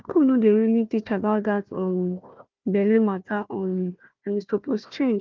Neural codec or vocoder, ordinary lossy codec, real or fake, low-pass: codec, 16 kHz, 1 kbps, FunCodec, trained on Chinese and English, 50 frames a second; Opus, 32 kbps; fake; 7.2 kHz